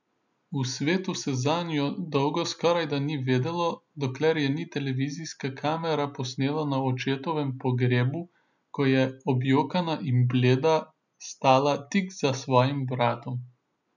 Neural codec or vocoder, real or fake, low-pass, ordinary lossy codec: none; real; 7.2 kHz; none